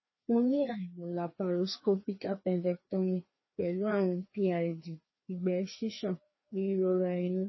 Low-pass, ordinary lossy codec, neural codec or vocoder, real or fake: 7.2 kHz; MP3, 24 kbps; codec, 16 kHz, 2 kbps, FreqCodec, larger model; fake